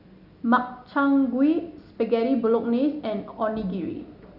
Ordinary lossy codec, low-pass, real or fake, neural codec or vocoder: none; 5.4 kHz; real; none